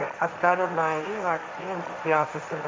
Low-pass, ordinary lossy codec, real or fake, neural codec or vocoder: none; none; fake; codec, 16 kHz, 1.1 kbps, Voila-Tokenizer